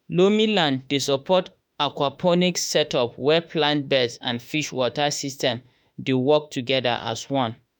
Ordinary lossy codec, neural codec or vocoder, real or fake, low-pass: none; autoencoder, 48 kHz, 32 numbers a frame, DAC-VAE, trained on Japanese speech; fake; none